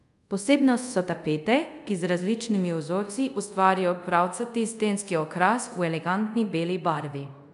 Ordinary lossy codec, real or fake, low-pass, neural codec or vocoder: none; fake; 10.8 kHz; codec, 24 kHz, 0.5 kbps, DualCodec